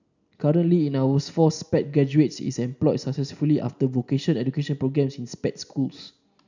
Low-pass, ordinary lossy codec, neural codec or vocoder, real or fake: 7.2 kHz; none; none; real